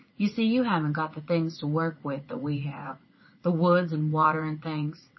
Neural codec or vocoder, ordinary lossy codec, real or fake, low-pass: vocoder, 44.1 kHz, 80 mel bands, Vocos; MP3, 24 kbps; fake; 7.2 kHz